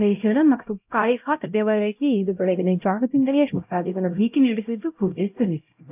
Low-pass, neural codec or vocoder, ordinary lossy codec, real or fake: 3.6 kHz; codec, 16 kHz, 0.5 kbps, X-Codec, HuBERT features, trained on LibriSpeech; AAC, 32 kbps; fake